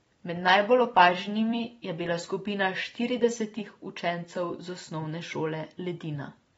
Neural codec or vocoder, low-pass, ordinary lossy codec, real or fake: vocoder, 44.1 kHz, 128 mel bands every 256 samples, BigVGAN v2; 19.8 kHz; AAC, 24 kbps; fake